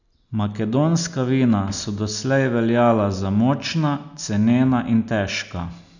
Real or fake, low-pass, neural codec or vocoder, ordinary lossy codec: real; 7.2 kHz; none; none